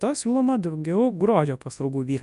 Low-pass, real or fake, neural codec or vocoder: 10.8 kHz; fake; codec, 24 kHz, 0.9 kbps, WavTokenizer, large speech release